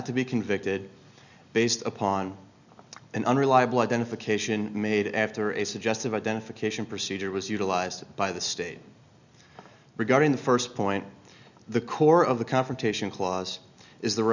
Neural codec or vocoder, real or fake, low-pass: none; real; 7.2 kHz